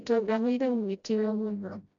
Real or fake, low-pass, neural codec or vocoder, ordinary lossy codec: fake; 7.2 kHz; codec, 16 kHz, 0.5 kbps, FreqCodec, smaller model; MP3, 96 kbps